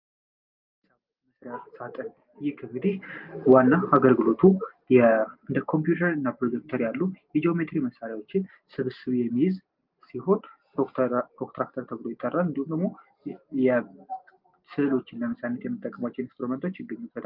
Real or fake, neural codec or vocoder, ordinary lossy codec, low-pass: real; none; Opus, 32 kbps; 5.4 kHz